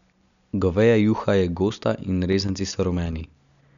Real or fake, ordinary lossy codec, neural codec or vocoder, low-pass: real; none; none; 7.2 kHz